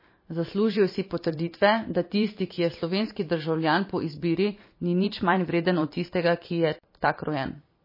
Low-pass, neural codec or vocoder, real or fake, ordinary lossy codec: 5.4 kHz; vocoder, 44.1 kHz, 128 mel bands every 512 samples, BigVGAN v2; fake; MP3, 24 kbps